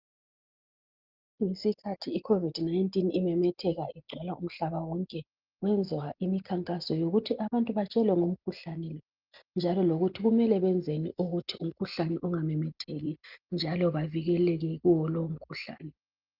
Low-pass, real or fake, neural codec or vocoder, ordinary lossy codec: 5.4 kHz; real; none; Opus, 32 kbps